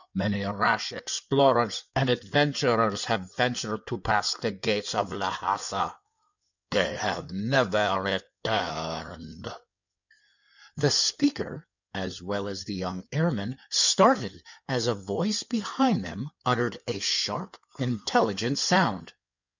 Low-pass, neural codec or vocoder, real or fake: 7.2 kHz; codec, 16 kHz in and 24 kHz out, 2.2 kbps, FireRedTTS-2 codec; fake